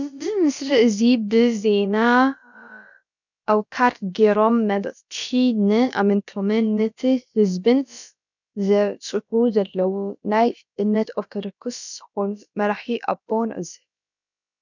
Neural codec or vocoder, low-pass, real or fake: codec, 16 kHz, about 1 kbps, DyCAST, with the encoder's durations; 7.2 kHz; fake